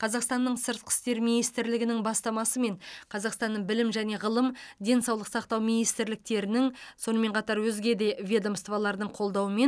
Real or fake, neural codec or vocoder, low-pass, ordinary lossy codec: real; none; none; none